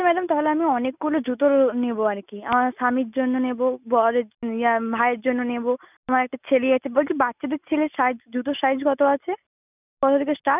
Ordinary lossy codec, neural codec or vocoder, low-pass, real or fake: none; none; 3.6 kHz; real